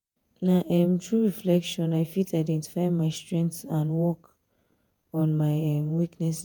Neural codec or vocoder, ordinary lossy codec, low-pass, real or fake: vocoder, 48 kHz, 128 mel bands, Vocos; none; none; fake